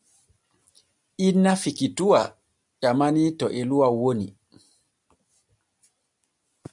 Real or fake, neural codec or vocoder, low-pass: real; none; 10.8 kHz